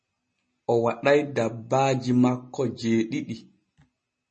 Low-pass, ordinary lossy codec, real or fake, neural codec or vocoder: 9.9 kHz; MP3, 32 kbps; real; none